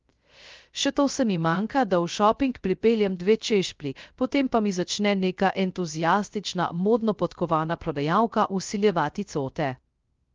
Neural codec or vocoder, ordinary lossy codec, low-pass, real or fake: codec, 16 kHz, 0.3 kbps, FocalCodec; Opus, 32 kbps; 7.2 kHz; fake